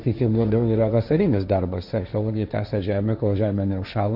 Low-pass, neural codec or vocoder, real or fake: 5.4 kHz; codec, 16 kHz, 1.1 kbps, Voila-Tokenizer; fake